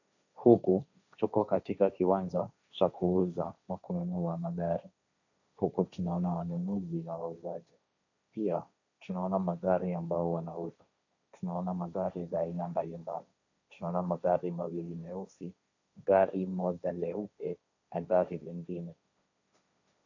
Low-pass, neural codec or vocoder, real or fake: 7.2 kHz; codec, 16 kHz, 1.1 kbps, Voila-Tokenizer; fake